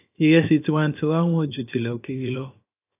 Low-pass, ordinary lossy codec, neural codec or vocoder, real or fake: 3.6 kHz; AAC, 24 kbps; codec, 16 kHz, 0.7 kbps, FocalCodec; fake